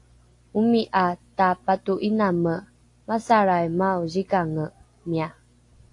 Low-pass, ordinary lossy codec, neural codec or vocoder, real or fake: 10.8 kHz; AAC, 48 kbps; none; real